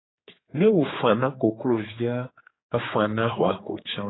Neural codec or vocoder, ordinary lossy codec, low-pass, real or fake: codec, 16 kHz, 4 kbps, X-Codec, HuBERT features, trained on general audio; AAC, 16 kbps; 7.2 kHz; fake